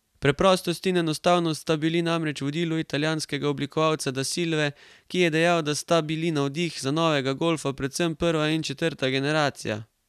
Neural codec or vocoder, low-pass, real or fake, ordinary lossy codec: none; 14.4 kHz; real; none